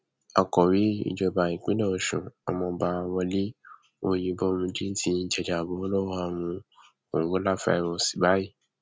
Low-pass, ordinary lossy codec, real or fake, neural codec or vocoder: none; none; real; none